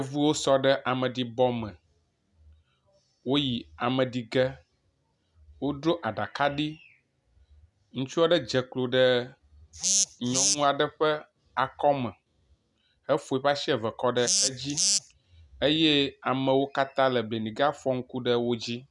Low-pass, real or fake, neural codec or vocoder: 10.8 kHz; real; none